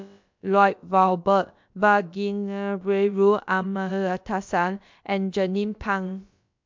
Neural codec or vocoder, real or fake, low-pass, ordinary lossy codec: codec, 16 kHz, about 1 kbps, DyCAST, with the encoder's durations; fake; 7.2 kHz; MP3, 64 kbps